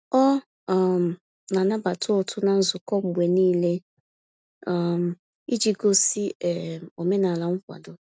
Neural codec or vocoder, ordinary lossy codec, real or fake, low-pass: none; none; real; none